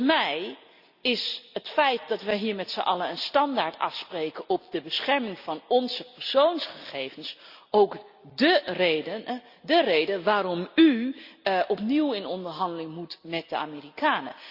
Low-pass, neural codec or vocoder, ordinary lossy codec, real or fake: 5.4 kHz; none; Opus, 64 kbps; real